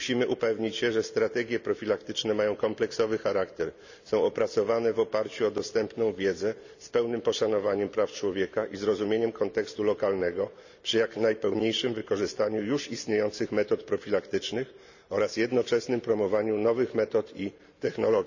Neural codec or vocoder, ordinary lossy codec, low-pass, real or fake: none; none; 7.2 kHz; real